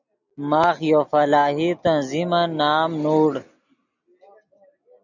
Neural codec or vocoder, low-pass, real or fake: none; 7.2 kHz; real